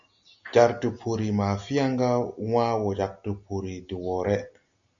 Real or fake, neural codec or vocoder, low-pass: real; none; 7.2 kHz